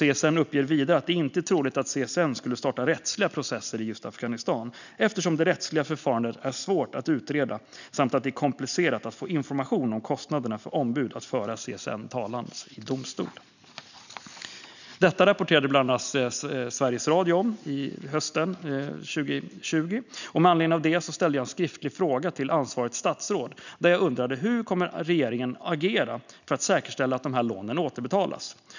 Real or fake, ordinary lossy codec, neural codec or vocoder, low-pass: real; none; none; 7.2 kHz